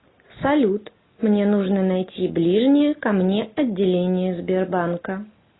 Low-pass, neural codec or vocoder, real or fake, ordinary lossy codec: 7.2 kHz; none; real; AAC, 16 kbps